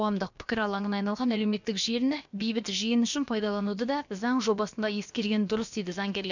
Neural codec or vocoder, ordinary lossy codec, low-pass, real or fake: codec, 16 kHz, about 1 kbps, DyCAST, with the encoder's durations; none; 7.2 kHz; fake